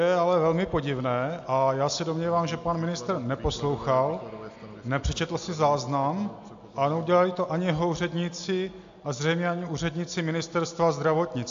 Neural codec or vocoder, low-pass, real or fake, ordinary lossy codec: none; 7.2 kHz; real; AAC, 64 kbps